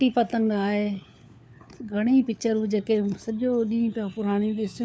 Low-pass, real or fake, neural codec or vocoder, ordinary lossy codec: none; fake; codec, 16 kHz, 4 kbps, FunCodec, trained on LibriTTS, 50 frames a second; none